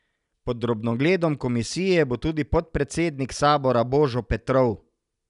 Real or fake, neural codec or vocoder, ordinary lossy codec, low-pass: real; none; none; 10.8 kHz